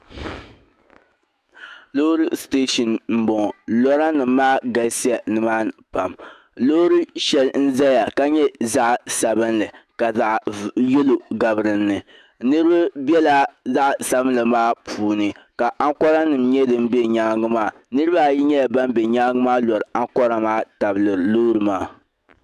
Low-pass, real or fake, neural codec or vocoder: 14.4 kHz; fake; autoencoder, 48 kHz, 128 numbers a frame, DAC-VAE, trained on Japanese speech